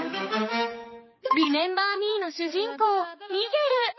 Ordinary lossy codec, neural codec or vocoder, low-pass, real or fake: MP3, 24 kbps; codec, 16 kHz, 4 kbps, X-Codec, HuBERT features, trained on balanced general audio; 7.2 kHz; fake